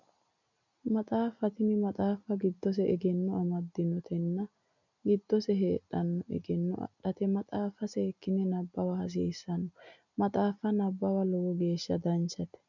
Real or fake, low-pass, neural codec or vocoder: real; 7.2 kHz; none